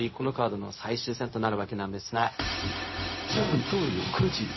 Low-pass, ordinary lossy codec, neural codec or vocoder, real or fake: 7.2 kHz; MP3, 24 kbps; codec, 16 kHz, 0.4 kbps, LongCat-Audio-Codec; fake